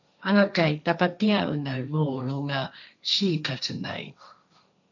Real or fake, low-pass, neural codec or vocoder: fake; 7.2 kHz; codec, 16 kHz, 1.1 kbps, Voila-Tokenizer